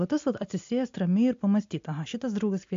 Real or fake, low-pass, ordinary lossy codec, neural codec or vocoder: real; 7.2 kHz; MP3, 48 kbps; none